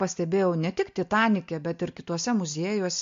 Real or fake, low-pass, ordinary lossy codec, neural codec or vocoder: real; 7.2 kHz; MP3, 48 kbps; none